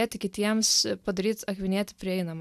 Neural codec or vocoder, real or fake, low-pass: none; real; 14.4 kHz